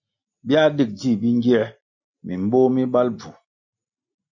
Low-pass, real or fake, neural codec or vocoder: 7.2 kHz; real; none